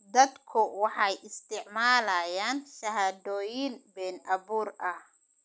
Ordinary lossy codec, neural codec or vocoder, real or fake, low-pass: none; none; real; none